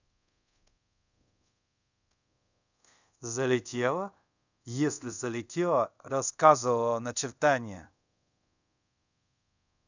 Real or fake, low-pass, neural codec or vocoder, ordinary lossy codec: fake; 7.2 kHz; codec, 24 kHz, 0.5 kbps, DualCodec; none